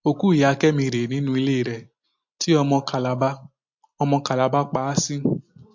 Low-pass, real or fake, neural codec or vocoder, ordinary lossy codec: 7.2 kHz; real; none; MP3, 48 kbps